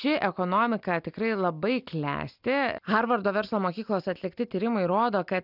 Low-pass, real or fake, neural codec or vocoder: 5.4 kHz; real; none